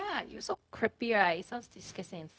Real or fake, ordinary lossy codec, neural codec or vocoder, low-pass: fake; none; codec, 16 kHz, 0.4 kbps, LongCat-Audio-Codec; none